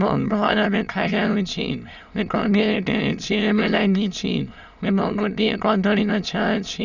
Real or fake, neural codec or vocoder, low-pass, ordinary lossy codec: fake; autoencoder, 22.05 kHz, a latent of 192 numbers a frame, VITS, trained on many speakers; 7.2 kHz; none